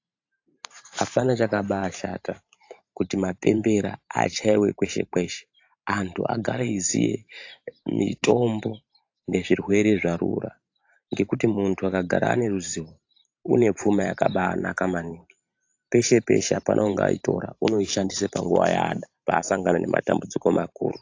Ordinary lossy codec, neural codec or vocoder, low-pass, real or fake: AAC, 48 kbps; vocoder, 44.1 kHz, 128 mel bands every 512 samples, BigVGAN v2; 7.2 kHz; fake